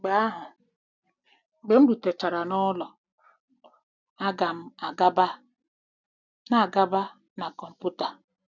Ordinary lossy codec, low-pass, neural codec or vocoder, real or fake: none; 7.2 kHz; none; real